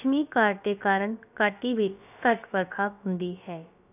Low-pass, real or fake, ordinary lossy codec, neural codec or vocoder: 3.6 kHz; fake; none; codec, 16 kHz, about 1 kbps, DyCAST, with the encoder's durations